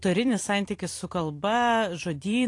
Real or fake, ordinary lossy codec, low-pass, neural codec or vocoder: fake; AAC, 48 kbps; 10.8 kHz; vocoder, 44.1 kHz, 128 mel bands every 256 samples, BigVGAN v2